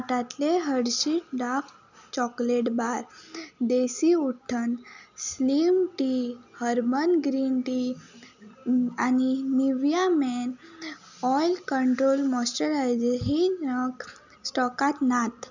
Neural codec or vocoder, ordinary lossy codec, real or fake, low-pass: none; none; real; 7.2 kHz